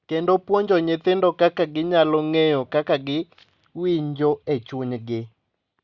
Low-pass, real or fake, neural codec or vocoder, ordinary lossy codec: 7.2 kHz; real; none; Opus, 64 kbps